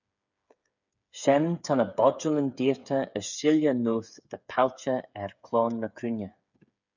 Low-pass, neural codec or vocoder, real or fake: 7.2 kHz; codec, 16 kHz, 8 kbps, FreqCodec, smaller model; fake